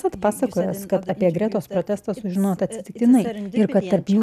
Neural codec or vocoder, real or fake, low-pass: none; real; 14.4 kHz